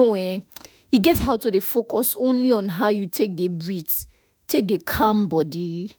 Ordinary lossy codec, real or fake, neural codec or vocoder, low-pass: none; fake; autoencoder, 48 kHz, 32 numbers a frame, DAC-VAE, trained on Japanese speech; none